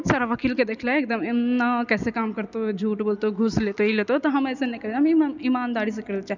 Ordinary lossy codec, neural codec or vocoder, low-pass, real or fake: none; none; 7.2 kHz; real